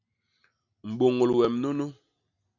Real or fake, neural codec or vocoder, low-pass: real; none; 7.2 kHz